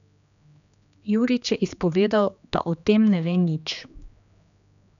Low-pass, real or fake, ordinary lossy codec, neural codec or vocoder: 7.2 kHz; fake; none; codec, 16 kHz, 2 kbps, X-Codec, HuBERT features, trained on general audio